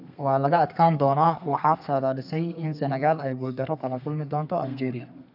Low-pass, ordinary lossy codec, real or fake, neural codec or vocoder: 5.4 kHz; none; fake; codec, 32 kHz, 1.9 kbps, SNAC